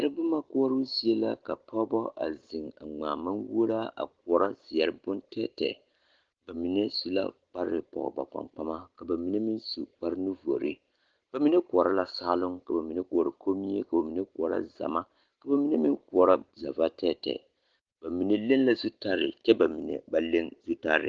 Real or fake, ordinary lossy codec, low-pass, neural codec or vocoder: real; Opus, 16 kbps; 7.2 kHz; none